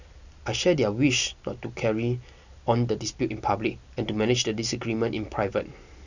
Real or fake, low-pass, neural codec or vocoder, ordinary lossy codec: real; 7.2 kHz; none; none